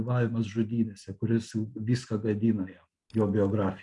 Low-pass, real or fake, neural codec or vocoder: 10.8 kHz; real; none